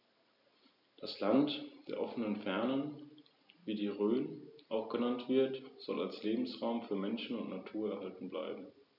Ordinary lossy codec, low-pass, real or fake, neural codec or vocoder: none; 5.4 kHz; real; none